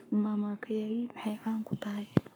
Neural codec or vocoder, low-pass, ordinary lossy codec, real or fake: codec, 44.1 kHz, 7.8 kbps, Pupu-Codec; none; none; fake